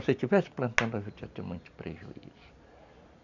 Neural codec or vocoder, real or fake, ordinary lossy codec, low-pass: none; real; none; 7.2 kHz